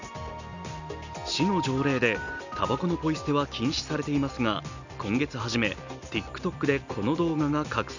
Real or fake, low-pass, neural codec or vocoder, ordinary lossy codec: real; 7.2 kHz; none; none